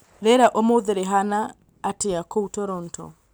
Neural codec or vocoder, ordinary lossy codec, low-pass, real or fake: none; none; none; real